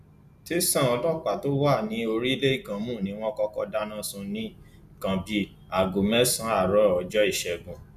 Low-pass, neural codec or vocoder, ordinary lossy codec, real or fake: 14.4 kHz; none; none; real